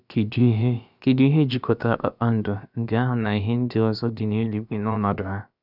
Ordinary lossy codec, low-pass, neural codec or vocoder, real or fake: none; 5.4 kHz; codec, 16 kHz, about 1 kbps, DyCAST, with the encoder's durations; fake